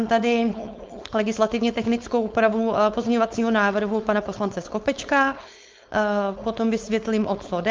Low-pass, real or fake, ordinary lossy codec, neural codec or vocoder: 7.2 kHz; fake; Opus, 24 kbps; codec, 16 kHz, 4.8 kbps, FACodec